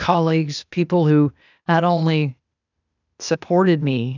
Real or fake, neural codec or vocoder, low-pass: fake; codec, 16 kHz, 0.8 kbps, ZipCodec; 7.2 kHz